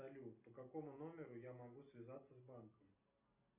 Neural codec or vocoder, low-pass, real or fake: none; 3.6 kHz; real